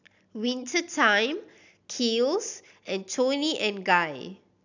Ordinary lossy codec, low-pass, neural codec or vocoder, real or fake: none; 7.2 kHz; none; real